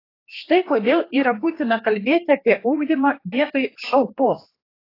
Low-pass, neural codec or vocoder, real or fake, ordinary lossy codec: 5.4 kHz; codec, 16 kHz, 2 kbps, X-Codec, HuBERT features, trained on general audio; fake; AAC, 24 kbps